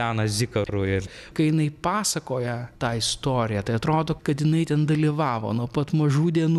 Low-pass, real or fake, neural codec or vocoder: 14.4 kHz; real; none